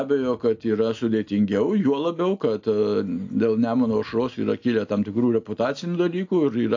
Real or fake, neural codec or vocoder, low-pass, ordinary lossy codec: real; none; 7.2 kHz; AAC, 48 kbps